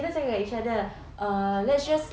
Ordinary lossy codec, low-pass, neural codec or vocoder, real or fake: none; none; none; real